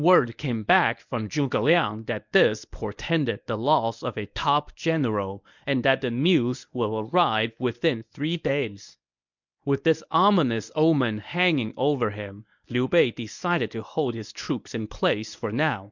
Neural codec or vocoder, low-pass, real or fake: codec, 24 kHz, 0.9 kbps, WavTokenizer, medium speech release version 1; 7.2 kHz; fake